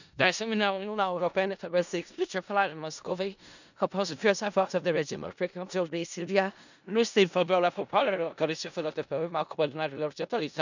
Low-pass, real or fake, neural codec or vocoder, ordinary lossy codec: 7.2 kHz; fake; codec, 16 kHz in and 24 kHz out, 0.4 kbps, LongCat-Audio-Codec, four codebook decoder; none